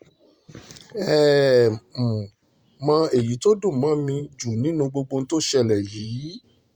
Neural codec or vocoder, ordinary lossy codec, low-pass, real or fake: vocoder, 44.1 kHz, 128 mel bands every 512 samples, BigVGAN v2; Opus, 64 kbps; 19.8 kHz; fake